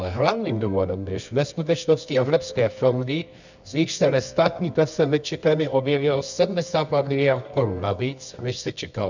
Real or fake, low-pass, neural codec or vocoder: fake; 7.2 kHz; codec, 24 kHz, 0.9 kbps, WavTokenizer, medium music audio release